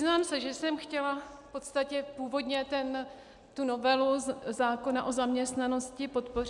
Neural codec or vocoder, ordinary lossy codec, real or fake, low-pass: none; MP3, 96 kbps; real; 10.8 kHz